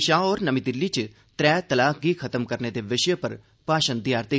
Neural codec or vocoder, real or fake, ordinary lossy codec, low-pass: none; real; none; none